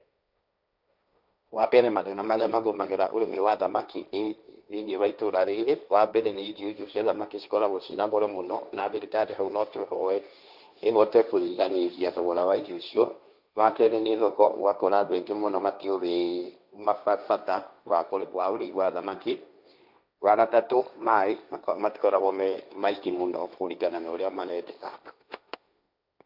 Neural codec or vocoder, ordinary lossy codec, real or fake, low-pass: codec, 16 kHz, 1.1 kbps, Voila-Tokenizer; none; fake; 5.4 kHz